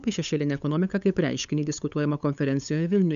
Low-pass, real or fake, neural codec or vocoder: 7.2 kHz; fake; codec, 16 kHz, 8 kbps, FunCodec, trained on LibriTTS, 25 frames a second